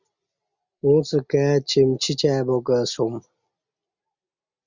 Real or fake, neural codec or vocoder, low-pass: real; none; 7.2 kHz